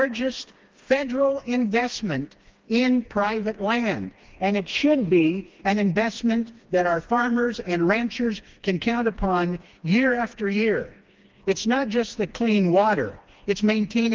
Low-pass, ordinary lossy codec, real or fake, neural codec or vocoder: 7.2 kHz; Opus, 32 kbps; fake; codec, 16 kHz, 2 kbps, FreqCodec, smaller model